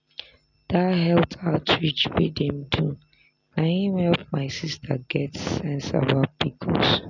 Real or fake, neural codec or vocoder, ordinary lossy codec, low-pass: real; none; none; 7.2 kHz